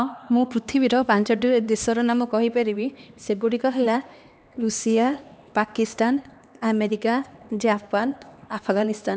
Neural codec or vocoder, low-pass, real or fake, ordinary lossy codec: codec, 16 kHz, 2 kbps, X-Codec, HuBERT features, trained on LibriSpeech; none; fake; none